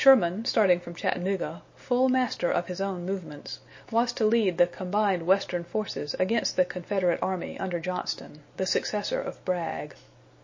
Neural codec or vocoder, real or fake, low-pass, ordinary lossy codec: none; real; 7.2 kHz; MP3, 32 kbps